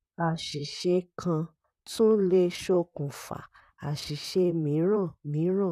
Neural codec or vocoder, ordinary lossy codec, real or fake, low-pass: vocoder, 44.1 kHz, 128 mel bands, Pupu-Vocoder; none; fake; 14.4 kHz